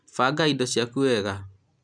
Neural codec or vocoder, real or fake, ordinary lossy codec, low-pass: none; real; none; 9.9 kHz